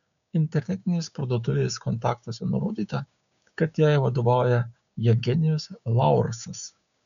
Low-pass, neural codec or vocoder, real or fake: 7.2 kHz; codec, 16 kHz, 6 kbps, DAC; fake